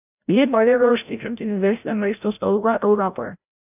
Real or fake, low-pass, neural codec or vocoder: fake; 3.6 kHz; codec, 16 kHz, 0.5 kbps, FreqCodec, larger model